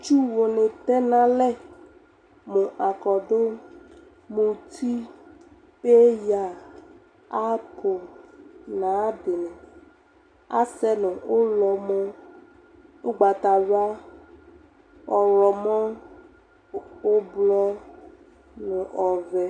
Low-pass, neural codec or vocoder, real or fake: 9.9 kHz; none; real